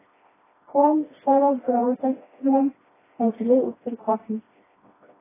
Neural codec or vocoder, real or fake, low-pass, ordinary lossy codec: codec, 16 kHz, 1 kbps, FreqCodec, smaller model; fake; 3.6 kHz; AAC, 16 kbps